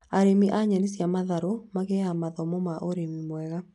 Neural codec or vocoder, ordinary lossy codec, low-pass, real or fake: none; MP3, 96 kbps; 10.8 kHz; real